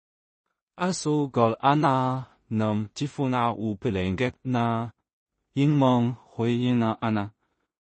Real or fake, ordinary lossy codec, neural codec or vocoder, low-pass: fake; MP3, 32 kbps; codec, 16 kHz in and 24 kHz out, 0.4 kbps, LongCat-Audio-Codec, two codebook decoder; 10.8 kHz